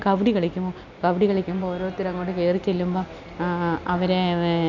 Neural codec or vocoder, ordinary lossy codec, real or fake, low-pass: none; none; real; 7.2 kHz